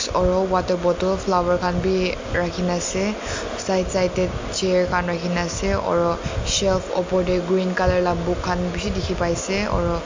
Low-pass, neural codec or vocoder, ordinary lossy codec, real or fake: 7.2 kHz; none; MP3, 48 kbps; real